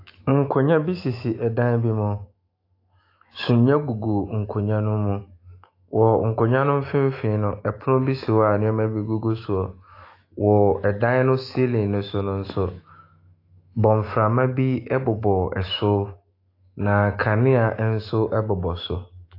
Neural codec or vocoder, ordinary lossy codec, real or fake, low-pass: autoencoder, 48 kHz, 128 numbers a frame, DAC-VAE, trained on Japanese speech; AAC, 32 kbps; fake; 5.4 kHz